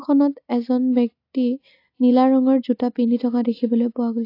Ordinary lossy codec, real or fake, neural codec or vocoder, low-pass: AAC, 32 kbps; real; none; 5.4 kHz